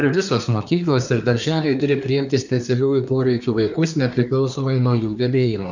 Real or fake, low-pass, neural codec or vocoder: fake; 7.2 kHz; codec, 24 kHz, 1 kbps, SNAC